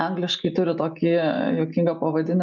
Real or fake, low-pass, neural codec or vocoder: real; 7.2 kHz; none